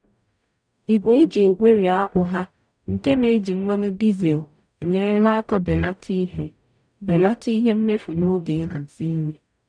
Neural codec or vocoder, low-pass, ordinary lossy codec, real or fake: codec, 44.1 kHz, 0.9 kbps, DAC; 9.9 kHz; none; fake